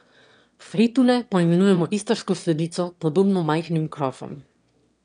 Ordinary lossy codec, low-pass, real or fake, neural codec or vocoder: none; 9.9 kHz; fake; autoencoder, 22.05 kHz, a latent of 192 numbers a frame, VITS, trained on one speaker